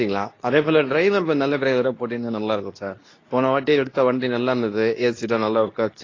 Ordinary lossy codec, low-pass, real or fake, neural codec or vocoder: AAC, 32 kbps; 7.2 kHz; fake; codec, 24 kHz, 0.9 kbps, WavTokenizer, medium speech release version 2